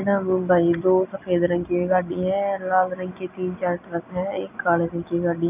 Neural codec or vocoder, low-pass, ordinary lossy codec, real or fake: none; 3.6 kHz; none; real